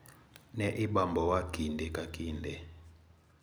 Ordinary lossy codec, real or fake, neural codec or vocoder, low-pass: none; real; none; none